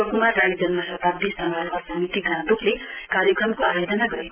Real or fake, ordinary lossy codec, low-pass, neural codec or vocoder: real; Opus, 24 kbps; 3.6 kHz; none